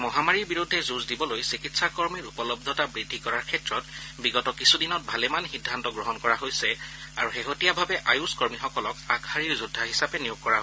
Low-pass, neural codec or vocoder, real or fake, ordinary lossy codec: none; none; real; none